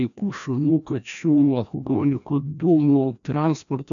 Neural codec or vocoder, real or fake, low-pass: codec, 16 kHz, 1 kbps, FreqCodec, larger model; fake; 7.2 kHz